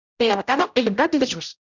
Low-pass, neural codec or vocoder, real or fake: 7.2 kHz; codec, 16 kHz, 0.5 kbps, X-Codec, HuBERT features, trained on general audio; fake